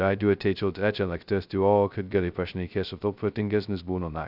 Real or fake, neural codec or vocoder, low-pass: fake; codec, 16 kHz, 0.2 kbps, FocalCodec; 5.4 kHz